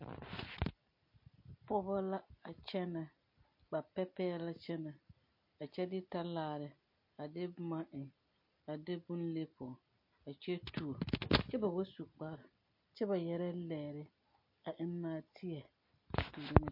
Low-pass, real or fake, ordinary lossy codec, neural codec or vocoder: 5.4 kHz; real; MP3, 32 kbps; none